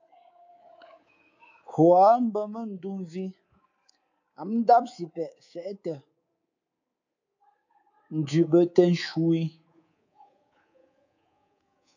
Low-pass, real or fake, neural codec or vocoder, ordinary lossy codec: 7.2 kHz; fake; codec, 24 kHz, 3.1 kbps, DualCodec; AAC, 48 kbps